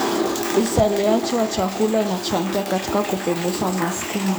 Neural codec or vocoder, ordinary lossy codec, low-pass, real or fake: codec, 44.1 kHz, 7.8 kbps, DAC; none; none; fake